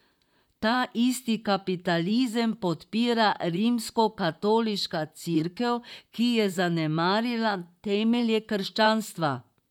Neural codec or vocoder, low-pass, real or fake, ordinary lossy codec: vocoder, 44.1 kHz, 128 mel bands, Pupu-Vocoder; 19.8 kHz; fake; none